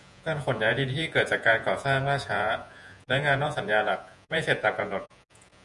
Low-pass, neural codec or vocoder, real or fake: 10.8 kHz; vocoder, 48 kHz, 128 mel bands, Vocos; fake